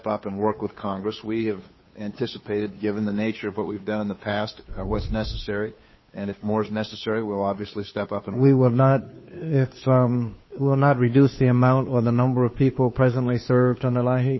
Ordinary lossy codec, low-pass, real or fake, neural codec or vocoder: MP3, 24 kbps; 7.2 kHz; fake; codec, 16 kHz, 2 kbps, FunCodec, trained on Chinese and English, 25 frames a second